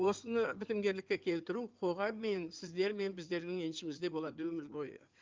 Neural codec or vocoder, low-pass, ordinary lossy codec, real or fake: codec, 16 kHz in and 24 kHz out, 2.2 kbps, FireRedTTS-2 codec; 7.2 kHz; Opus, 24 kbps; fake